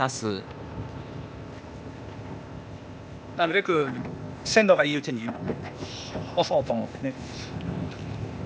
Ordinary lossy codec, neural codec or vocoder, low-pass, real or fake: none; codec, 16 kHz, 0.8 kbps, ZipCodec; none; fake